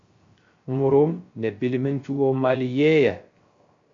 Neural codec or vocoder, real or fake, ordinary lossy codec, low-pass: codec, 16 kHz, 0.3 kbps, FocalCodec; fake; MP3, 48 kbps; 7.2 kHz